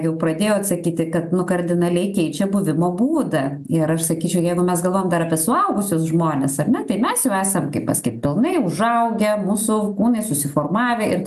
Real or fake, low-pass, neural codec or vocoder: real; 14.4 kHz; none